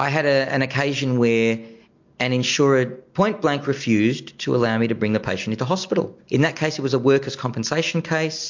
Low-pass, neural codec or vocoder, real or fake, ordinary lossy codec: 7.2 kHz; none; real; MP3, 48 kbps